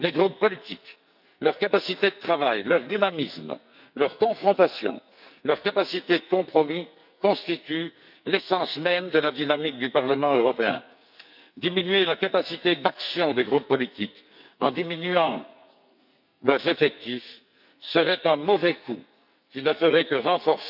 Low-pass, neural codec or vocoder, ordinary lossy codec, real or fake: 5.4 kHz; codec, 44.1 kHz, 2.6 kbps, SNAC; none; fake